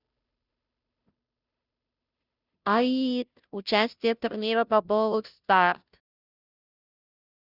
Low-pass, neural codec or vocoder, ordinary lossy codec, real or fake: 5.4 kHz; codec, 16 kHz, 0.5 kbps, FunCodec, trained on Chinese and English, 25 frames a second; none; fake